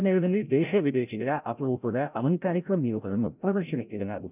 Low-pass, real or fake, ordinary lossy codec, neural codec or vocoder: 3.6 kHz; fake; none; codec, 16 kHz, 0.5 kbps, FreqCodec, larger model